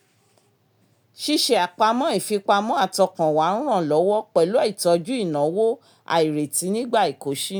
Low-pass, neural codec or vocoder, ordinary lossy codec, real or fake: none; none; none; real